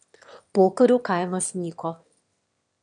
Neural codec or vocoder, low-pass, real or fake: autoencoder, 22.05 kHz, a latent of 192 numbers a frame, VITS, trained on one speaker; 9.9 kHz; fake